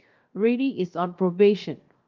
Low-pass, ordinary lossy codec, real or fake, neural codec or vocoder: 7.2 kHz; Opus, 32 kbps; fake; codec, 16 kHz, 0.7 kbps, FocalCodec